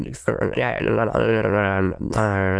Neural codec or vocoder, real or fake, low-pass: autoencoder, 22.05 kHz, a latent of 192 numbers a frame, VITS, trained on many speakers; fake; 9.9 kHz